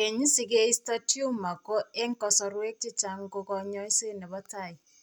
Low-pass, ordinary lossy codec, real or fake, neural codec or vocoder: none; none; real; none